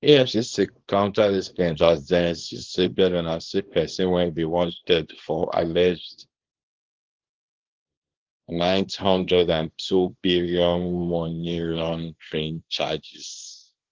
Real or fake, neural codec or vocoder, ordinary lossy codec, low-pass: fake; codec, 24 kHz, 0.9 kbps, WavTokenizer, small release; Opus, 16 kbps; 7.2 kHz